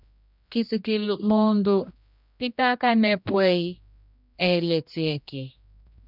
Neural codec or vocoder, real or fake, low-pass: codec, 16 kHz, 1 kbps, X-Codec, HuBERT features, trained on general audio; fake; 5.4 kHz